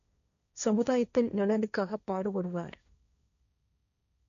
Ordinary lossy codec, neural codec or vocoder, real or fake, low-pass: none; codec, 16 kHz, 1.1 kbps, Voila-Tokenizer; fake; 7.2 kHz